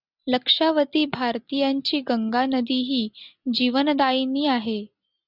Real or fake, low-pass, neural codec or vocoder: real; 5.4 kHz; none